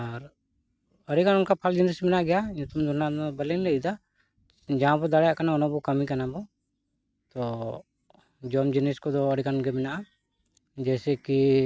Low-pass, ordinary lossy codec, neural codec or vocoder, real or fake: none; none; none; real